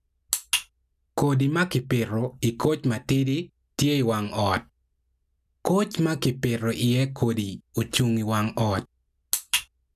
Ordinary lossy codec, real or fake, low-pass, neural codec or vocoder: none; real; 14.4 kHz; none